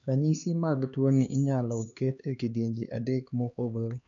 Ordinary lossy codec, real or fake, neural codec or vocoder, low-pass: none; fake; codec, 16 kHz, 2 kbps, X-Codec, HuBERT features, trained on LibriSpeech; 7.2 kHz